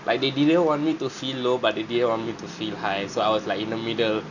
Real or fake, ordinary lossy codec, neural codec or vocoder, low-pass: real; none; none; 7.2 kHz